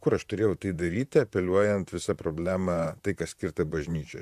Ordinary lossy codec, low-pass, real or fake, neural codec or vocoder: AAC, 96 kbps; 14.4 kHz; fake; vocoder, 44.1 kHz, 128 mel bands, Pupu-Vocoder